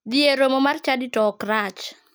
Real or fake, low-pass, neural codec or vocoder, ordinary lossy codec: real; none; none; none